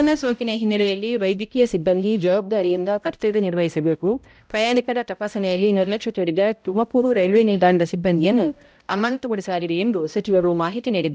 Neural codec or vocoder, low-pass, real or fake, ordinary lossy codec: codec, 16 kHz, 0.5 kbps, X-Codec, HuBERT features, trained on balanced general audio; none; fake; none